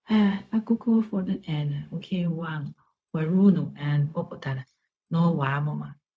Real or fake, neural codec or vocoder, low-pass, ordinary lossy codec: fake; codec, 16 kHz, 0.4 kbps, LongCat-Audio-Codec; none; none